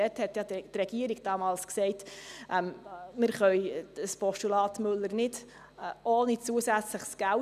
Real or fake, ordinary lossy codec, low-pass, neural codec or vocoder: real; none; 14.4 kHz; none